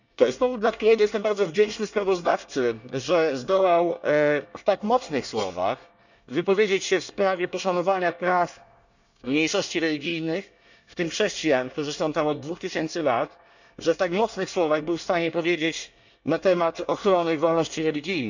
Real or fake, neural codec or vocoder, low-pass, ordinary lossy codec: fake; codec, 24 kHz, 1 kbps, SNAC; 7.2 kHz; none